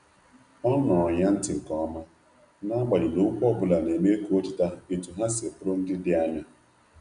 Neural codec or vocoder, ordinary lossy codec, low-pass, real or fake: none; none; 9.9 kHz; real